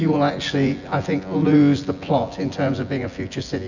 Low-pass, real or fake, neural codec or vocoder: 7.2 kHz; fake; vocoder, 24 kHz, 100 mel bands, Vocos